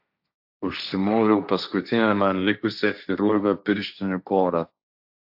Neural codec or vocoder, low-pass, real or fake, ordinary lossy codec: codec, 16 kHz, 1.1 kbps, Voila-Tokenizer; 5.4 kHz; fake; AAC, 48 kbps